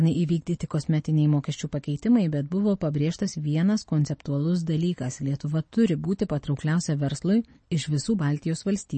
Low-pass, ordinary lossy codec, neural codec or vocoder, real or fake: 10.8 kHz; MP3, 32 kbps; none; real